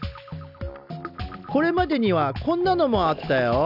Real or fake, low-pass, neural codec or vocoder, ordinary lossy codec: real; 5.4 kHz; none; none